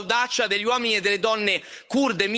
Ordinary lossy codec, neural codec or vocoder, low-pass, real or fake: none; codec, 16 kHz, 8 kbps, FunCodec, trained on Chinese and English, 25 frames a second; none; fake